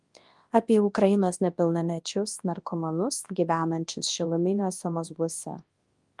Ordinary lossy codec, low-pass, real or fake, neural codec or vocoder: Opus, 24 kbps; 10.8 kHz; fake; codec, 24 kHz, 0.9 kbps, WavTokenizer, large speech release